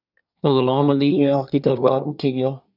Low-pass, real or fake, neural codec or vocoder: 5.4 kHz; fake; codec, 24 kHz, 1 kbps, SNAC